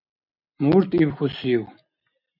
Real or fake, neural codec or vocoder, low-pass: real; none; 5.4 kHz